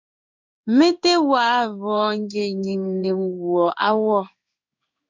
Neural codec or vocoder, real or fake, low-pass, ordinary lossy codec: codec, 16 kHz in and 24 kHz out, 1 kbps, XY-Tokenizer; fake; 7.2 kHz; MP3, 64 kbps